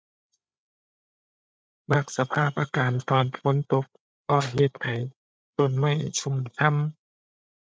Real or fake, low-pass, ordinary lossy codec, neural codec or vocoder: fake; none; none; codec, 16 kHz, 8 kbps, FreqCodec, larger model